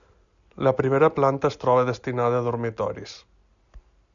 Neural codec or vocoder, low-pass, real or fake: none; 7.2 kHz; real